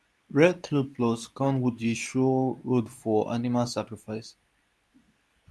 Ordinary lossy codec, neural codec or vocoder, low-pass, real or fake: none; codec, 24 kHz, 0.9 kbps, WavTokenizer, medium speech release version 2; none; fake